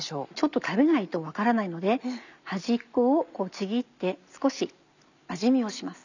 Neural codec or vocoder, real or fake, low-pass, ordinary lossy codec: none; real; 7.2 kHz; none